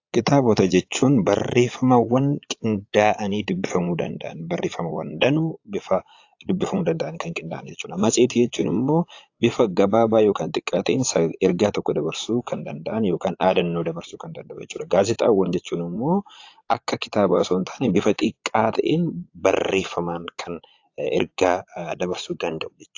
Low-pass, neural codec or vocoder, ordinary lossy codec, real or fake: 7.2 kHz; vocoder, 22.05 kHz, 80 mel bands, Vocos; AAC, 48 kbps; fake